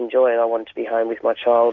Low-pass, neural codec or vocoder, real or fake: 7.2 kHz; none; real